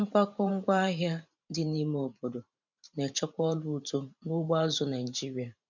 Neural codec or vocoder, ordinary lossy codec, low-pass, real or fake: vocoder, 44.1 kHz, 128 mel bands every 512 samples, BigVGAN v2; none; 7.2 kHz; fake